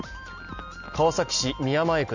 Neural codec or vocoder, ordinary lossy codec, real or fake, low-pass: none; none; real; 7.2 kHz